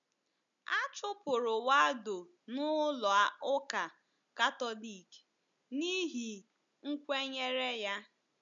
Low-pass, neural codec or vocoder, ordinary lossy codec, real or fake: 7.2 kHz; none; none; real